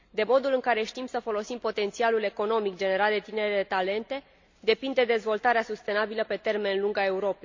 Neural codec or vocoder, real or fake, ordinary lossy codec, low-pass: none; real; MP3, 64 kbps; 7.2 kHz